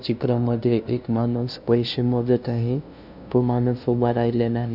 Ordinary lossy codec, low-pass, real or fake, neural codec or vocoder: none; 5.4 kHz; fake; codec, 16 kHz, 0.5 kbps, FunCodec, trained on LibriTTS, 25 frames a second